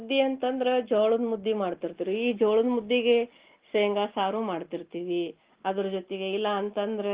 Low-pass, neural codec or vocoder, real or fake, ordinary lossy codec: 3.6 kHz; none; real; Opus, 16 kbps